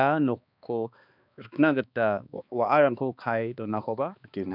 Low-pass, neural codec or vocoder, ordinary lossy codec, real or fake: 5.4 kHz; codec, 16 kHz, 2 kbps, X-Codec, HuBERT features, trained on balanced general audio; none; fake